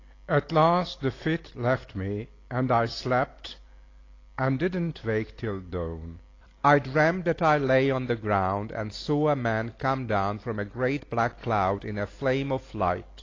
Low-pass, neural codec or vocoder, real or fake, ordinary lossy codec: 7.2 kHz; none; real; AAC, 32 kbps